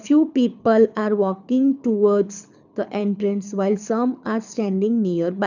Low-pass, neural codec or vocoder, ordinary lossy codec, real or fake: 7.2 kHz; codec, 24 kHz, 6 kbps, HILCodec; none; fake